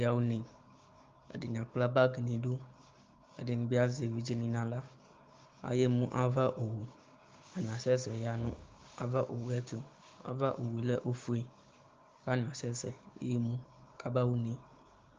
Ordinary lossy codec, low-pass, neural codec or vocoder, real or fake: Opus, 32 kbps; 7.2 kHz; codec, 16 kHz, 6 kbps, DAC; fake